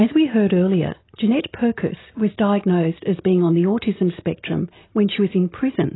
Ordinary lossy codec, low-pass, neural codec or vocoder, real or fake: AAC, 16 kbps; 7.2 kHz; none; real